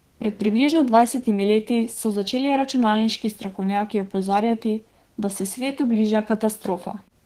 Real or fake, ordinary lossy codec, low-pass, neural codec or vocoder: fake; Opus, 16 kbps; 14.4 kHz; codec, 32 kHz, 1.9 kbps, SNAC